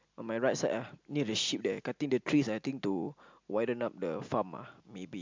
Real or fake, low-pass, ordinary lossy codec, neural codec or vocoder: real; 7.2 kHz; MP3, 64 kbps; none